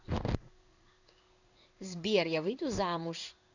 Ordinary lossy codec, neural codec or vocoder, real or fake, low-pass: none; codec, 16 kHz in and 24 kHz out, 1 kbps, XY-Tokenizer; fake; 7.2 kHz